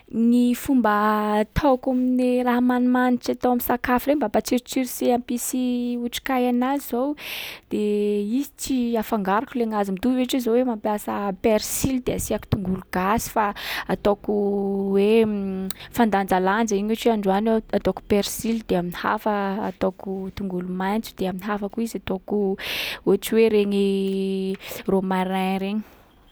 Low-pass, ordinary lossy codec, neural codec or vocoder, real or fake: none; none; none; real